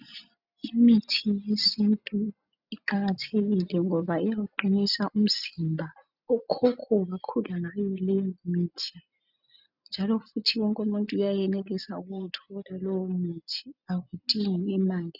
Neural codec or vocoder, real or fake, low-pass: none; real; 5.4 kHz